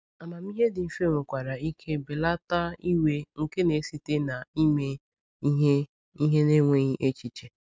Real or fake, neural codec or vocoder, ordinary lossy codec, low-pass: real; none; none; none